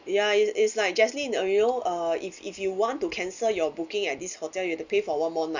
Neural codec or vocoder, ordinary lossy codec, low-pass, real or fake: none; Opus, 64 kbps; 7.2 kHz; real